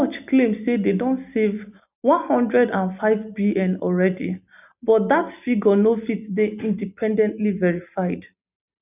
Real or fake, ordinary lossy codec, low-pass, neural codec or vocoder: real; none; 3.6 kHz; none